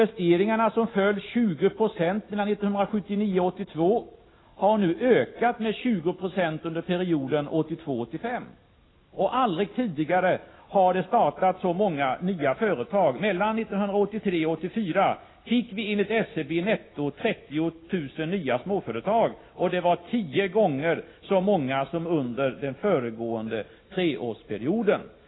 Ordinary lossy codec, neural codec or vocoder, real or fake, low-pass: AAC, 16 kbps; none; real; 7.2 kHz